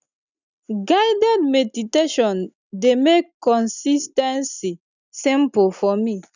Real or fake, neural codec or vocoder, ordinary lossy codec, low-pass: real; none; none; 7.2 kHz